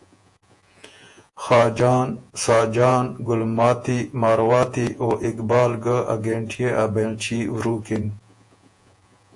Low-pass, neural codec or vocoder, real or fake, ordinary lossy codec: 10.8 kHz; vocoder, 48 kHz, 128 mel bands, Vocos; fake; AAC, 64 kbps